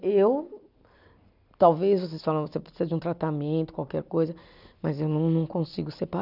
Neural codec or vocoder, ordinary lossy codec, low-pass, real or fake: none; none; 5.4 kHz; real